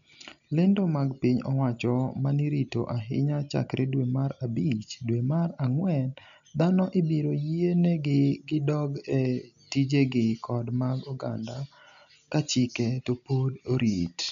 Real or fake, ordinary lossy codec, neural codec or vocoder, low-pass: real; none; none; 7.2 kHz